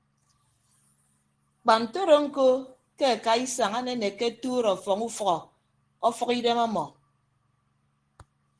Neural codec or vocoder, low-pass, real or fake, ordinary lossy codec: none; 9.9 kHz; real; Opus, 16 kbps